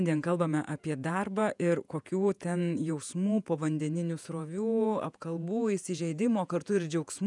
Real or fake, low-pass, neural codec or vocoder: fake; 10.8 kHz; vocoder, 48 kHz, 128 mel bands, Vocos